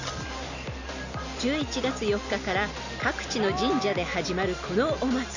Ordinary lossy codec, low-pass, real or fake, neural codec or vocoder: none; 7.2 kHz; real; none